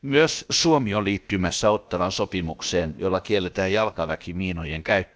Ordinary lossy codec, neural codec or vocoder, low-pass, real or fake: none; codec, 16 kHz, about 1 kbps, DyCAST, with the encoder's durations; none; fake